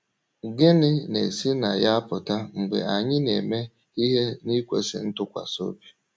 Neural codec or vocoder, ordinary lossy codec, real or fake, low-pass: none; none; real; none